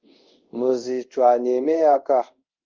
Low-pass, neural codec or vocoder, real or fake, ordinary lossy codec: 7.2 kHz; codec, 24 kHz, 0.5 kbps, DualCodec; fake; Opus, 24 kbps